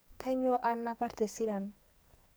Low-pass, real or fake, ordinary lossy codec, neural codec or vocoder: none; fake; none; codec, 44.1 kHz, 2.6 kbps, SNAC